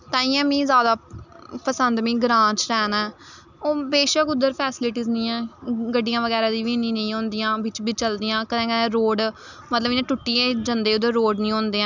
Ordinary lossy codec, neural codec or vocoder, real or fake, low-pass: none; none; real; 7.2 kHz